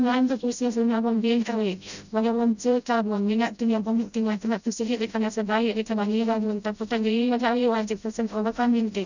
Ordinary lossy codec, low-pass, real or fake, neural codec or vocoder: none; 7.2 kHz; fake; codec, 16 kHz, 0.5 kbps, FreqCodec, smaller model